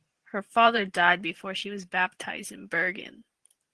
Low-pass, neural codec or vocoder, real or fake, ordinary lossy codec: 10.8 kHz; vocoder, 44.1 kHz, 128 mel bands every 512 samples, BigVGAN v2; fake; Opus, 16 kbps